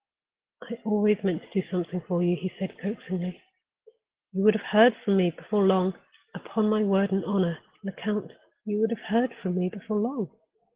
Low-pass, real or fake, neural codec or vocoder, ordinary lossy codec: 3.6 kHz; real; none; Opus, 16 kbps